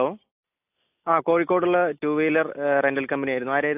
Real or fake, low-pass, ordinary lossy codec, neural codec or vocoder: real; 3.6 kHz; AAC, 32 kbps; none